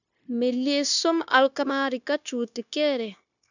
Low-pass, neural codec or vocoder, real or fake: 7.2 kHz; codec, 16 kHz, 0.9 kbps, LongCat-Audio-Codec; fake